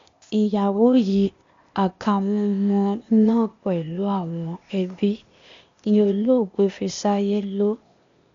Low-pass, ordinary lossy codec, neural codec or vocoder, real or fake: 7.2 kHz; MP3, 48 kbps; codec, 16 kHz, 0.8 kbps, ZipCodec; fake